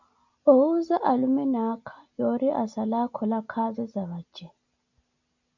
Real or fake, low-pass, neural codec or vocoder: real; 7.2 kHz; none